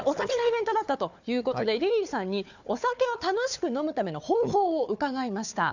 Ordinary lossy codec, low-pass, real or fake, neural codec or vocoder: none; 7.2 kHz; fake; codec, 16 kHz, 4 kbps, FunCodec, trained on Chinese and English, 50 frames a second